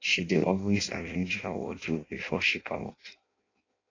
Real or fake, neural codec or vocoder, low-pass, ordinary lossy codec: fake; codec, 16 kHz in and 24 kHz out, 0.6 kbps, FireRedTTS-2 codec; 7.2 kHz; AAC, 32 kbps